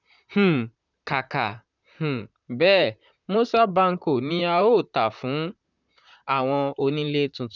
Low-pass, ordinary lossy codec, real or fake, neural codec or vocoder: 7.2 kHz; none; fake; vocoder, 44.1 kHz, 128 mel bands, Pupu-Vocoder